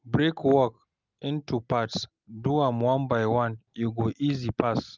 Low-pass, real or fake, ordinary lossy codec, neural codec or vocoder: 7.2 kHz; real; Opus, 24 kbps; none